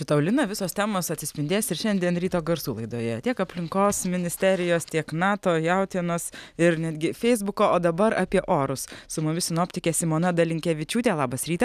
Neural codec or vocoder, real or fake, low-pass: none; real; 14.4 kHz